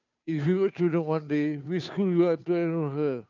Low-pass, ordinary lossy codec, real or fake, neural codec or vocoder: 7.2 kHz; none; fake; codec, 16 kHz, 2 kbps, FunCodec, trained on Chinese and English, 25 frames a second